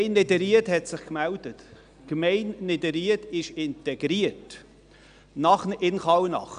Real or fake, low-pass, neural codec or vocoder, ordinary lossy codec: real; 9.9 kHz; none; none